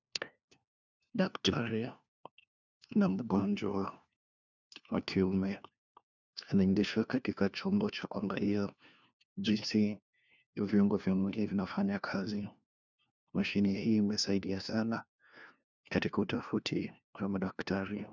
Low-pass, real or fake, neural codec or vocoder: 7.2 kHz; fake; codec, 16 kHz, 1 kbps, FunCodec, trained on LibriTTS, 50 frames a second